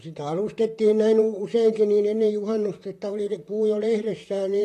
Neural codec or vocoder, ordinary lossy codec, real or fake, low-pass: vocoder, 44.1 kHz, 128 mel bands, Pupu-Vocoder; AAC, 48 kbps; fake; 14.4 kHz